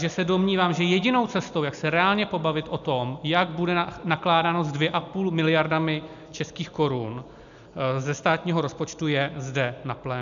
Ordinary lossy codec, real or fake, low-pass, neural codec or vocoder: AAC, 96 kbps; real; 7.2 kHz; none